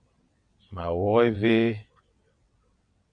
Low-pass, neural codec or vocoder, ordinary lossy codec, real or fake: 9.9 kHz; vocoder, 22.05 kHz, 80 mel bands, WaveNeXt; MP3, 64 kbps; fake